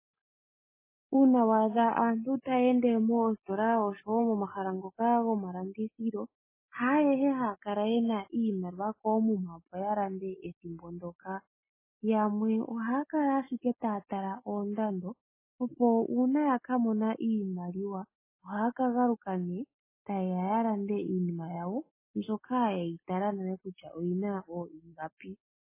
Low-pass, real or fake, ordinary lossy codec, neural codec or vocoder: 3.6 kHz; real; MP3, 16 kbps; none